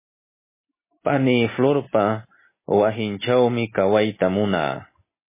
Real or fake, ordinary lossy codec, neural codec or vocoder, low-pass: real; MP3, 16 kbps; none; 3.6 kHz